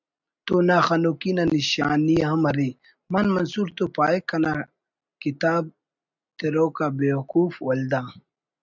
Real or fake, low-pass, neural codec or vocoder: real; 7.2 kHz; none